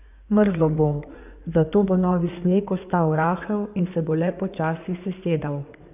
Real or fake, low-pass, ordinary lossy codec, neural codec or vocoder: fake; 3.6 kHz; none; codec, 16 kHz in and 24 kHz out, 2.2 kbps, FireRedTTS-2 codec